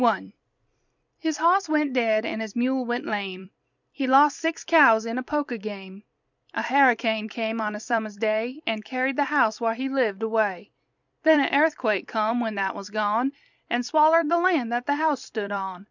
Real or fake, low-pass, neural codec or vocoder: fake; 7.2 kHz; vocoder, 44.1 kHz, 128 mel bands every 256 samples, BigVGAN v2